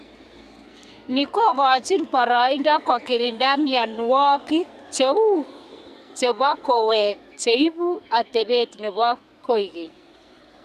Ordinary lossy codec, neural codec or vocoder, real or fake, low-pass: none; codec, 44.1 kHz, 2.6 kbps, SNAC; fake; 14.4 kHz